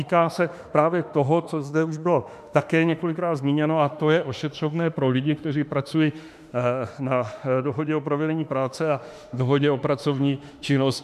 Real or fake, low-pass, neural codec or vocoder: fake; 14.4 kHz; autoencoder, 48 kHz, 32 numbers a frame, DAC-VAE, trained on Japanese speech